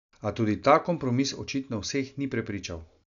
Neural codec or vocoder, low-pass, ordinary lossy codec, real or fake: none; 7.2 kHz; none; real